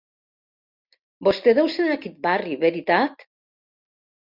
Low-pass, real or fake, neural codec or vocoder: 5.4 kHz; real; none